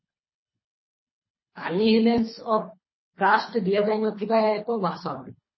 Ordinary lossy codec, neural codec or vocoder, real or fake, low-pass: MP3, 24 kbps; codec, 24 kHz, 3 kbps, HILCodec; fake; 7.2 kHz